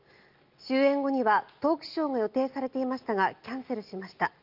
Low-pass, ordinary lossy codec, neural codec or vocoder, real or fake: 5.4 kHz; Opus, 32 kbps; none; real